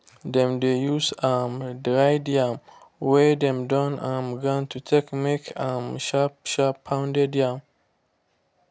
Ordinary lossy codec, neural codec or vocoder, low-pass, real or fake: none; none; none; real